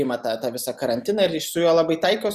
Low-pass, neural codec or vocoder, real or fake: 14.4 kHz; none; real